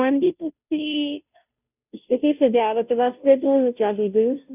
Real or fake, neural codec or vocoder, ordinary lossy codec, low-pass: fake; codec, 16 kHz, 0.5 kbps, FunCodec, trained on Chinese and English, 25 frames a second; none; 3.6 kHz